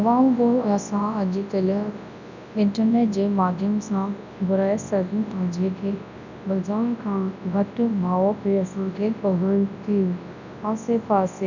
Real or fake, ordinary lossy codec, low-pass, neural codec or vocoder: fake; none; 7.2 kHz; codec, 24 kHz, 0.9 kbps, WavTokenizer, large speech release